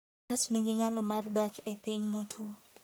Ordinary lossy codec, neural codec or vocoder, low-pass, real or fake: none; codec, 44.1 kHz, 1.7 kbps, Pupu-Codec; none; fake